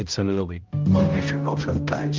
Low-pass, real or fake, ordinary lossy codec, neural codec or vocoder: 7.2 kHz; fake; Opus, 24 kbps; codec, 16 kHz, 0.5 kbps, X-Codec, HuBERT features, trained on balanced general audio